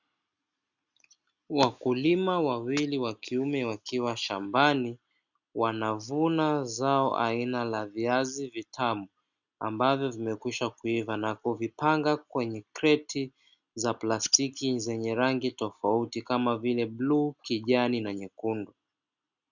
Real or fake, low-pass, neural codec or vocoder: real; 7.2 kHz; none